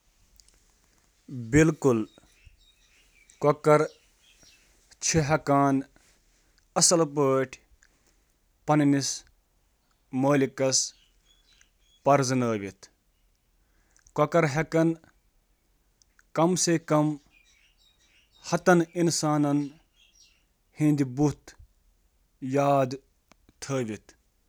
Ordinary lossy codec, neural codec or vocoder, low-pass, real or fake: none; none; none; real